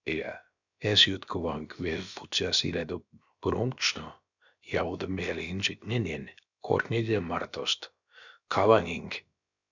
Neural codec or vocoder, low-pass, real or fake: codec, 16 kHz, about 1 kbps, DyCAST, with the encoder's durations; 7.2 kHz; fake